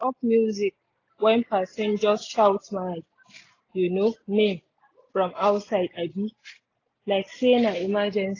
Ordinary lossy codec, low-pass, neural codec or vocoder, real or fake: AAC, 32 kbps; 7.2 kHz; none; real